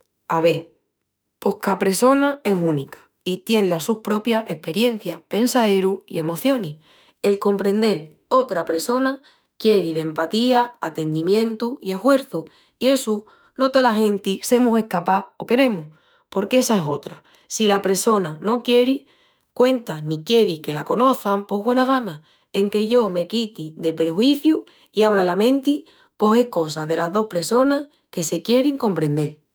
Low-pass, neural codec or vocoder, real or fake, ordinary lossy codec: none; autoencoder, 48 kHz, 32 numbers a frame, DAC-VAE, trained on Japanese speech; fake; none